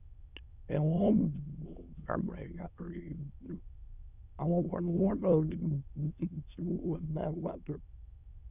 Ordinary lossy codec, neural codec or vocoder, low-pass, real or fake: Opus, 64 kbps; autoencoder, 22.05 kHz, a latent of 192 numbers a frame, VITS, trained on many speakers; 3.6 kHz; fake